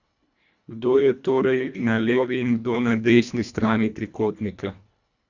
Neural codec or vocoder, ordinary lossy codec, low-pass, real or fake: codec, 24 kHz, 1.5 kbps, HILCodec; none; 7.2 kHz; fake